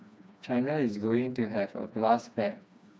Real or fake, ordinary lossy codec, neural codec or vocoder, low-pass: fake; none; codec, 16 kHz, 2 kbps, FreqCodec, smaller model; none